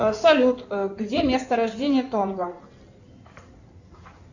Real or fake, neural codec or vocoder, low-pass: fake; codec, 16 kHz in and 24 kHz out, 2.2 kbps, FireRedTTS-2 codec; 7.2 kHz